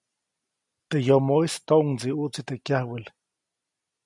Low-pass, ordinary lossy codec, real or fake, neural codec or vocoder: 10.8 kHz; MP3, 96 kbps; real; none